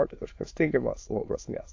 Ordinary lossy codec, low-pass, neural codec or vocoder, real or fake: AAC, 48 kbps; 7.2 kHz; autoencoder, 22.05 kHz, a latent of 192 numbers a frame, VITS, trained on many speakers; fake